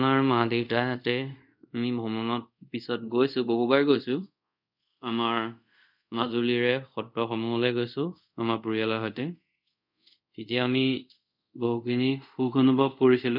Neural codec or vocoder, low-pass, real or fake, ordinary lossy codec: codec, 24 kHz, 0.5 kbps, DualCodec; 5.4 kHz; fake; none